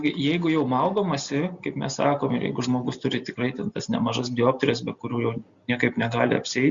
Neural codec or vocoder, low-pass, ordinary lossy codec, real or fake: none; 7.2 kHz; Opus, 64 kbps; real